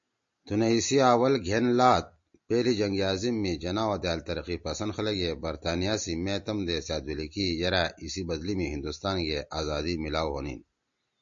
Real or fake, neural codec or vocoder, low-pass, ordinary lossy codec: real; none; 7.2 kHz; MP3, 48 kbps